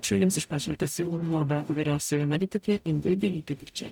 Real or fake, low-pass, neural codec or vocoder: fake; 19.8 kHz; codec, 44.1 kHz, 0.9 kbps, DAC